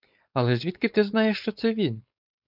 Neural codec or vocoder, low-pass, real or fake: codec, 16 kHz, 4.8 kbps, FACodec; 5.4 kHz; fake